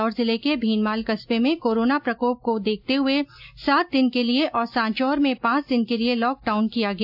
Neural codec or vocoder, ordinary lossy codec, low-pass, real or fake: none; AAC, 48 kbps; 5.4 kHz; real